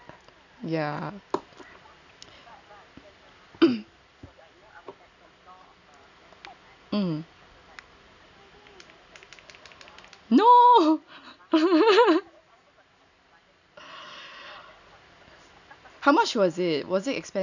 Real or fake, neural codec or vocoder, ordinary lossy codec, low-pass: real; none; none; 7.2 kHz